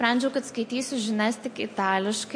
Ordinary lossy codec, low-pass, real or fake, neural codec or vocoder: MP3, 48 kbps; 9.9 kHz; real; none